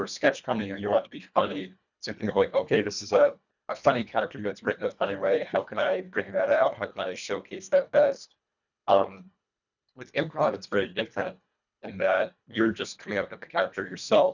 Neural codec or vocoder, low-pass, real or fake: codec, 24 kHz, 1.5 kbps, HILCodec; 7.2 kHz; fake